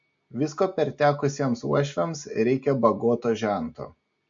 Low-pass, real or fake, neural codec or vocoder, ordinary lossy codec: 7.2 kHz; real; none; MP3, 48 kbps